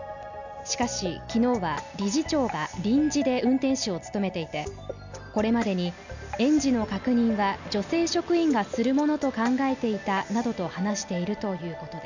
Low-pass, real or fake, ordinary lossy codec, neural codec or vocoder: 7.2 kHz; real; none; none